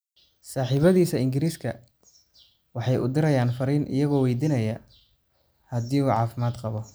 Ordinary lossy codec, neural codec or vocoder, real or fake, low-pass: none; none; real; none